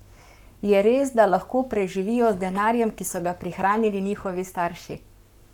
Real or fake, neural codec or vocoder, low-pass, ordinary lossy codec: fake; codec, 44.1 kHz, 7.8 kbps, Pupu-Codec; 19.8 kHz; none